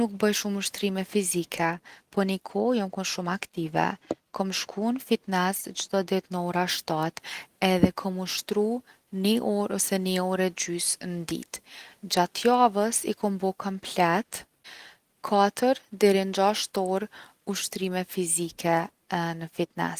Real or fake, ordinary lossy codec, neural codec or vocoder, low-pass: real; Opus, 24 kbps; none; 14.4 kHz